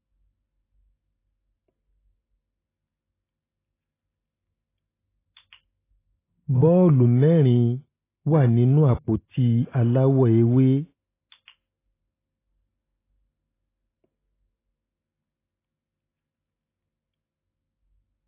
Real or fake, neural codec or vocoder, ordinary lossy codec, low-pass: real; none; AAC, 16 kbps; 3.6 kHz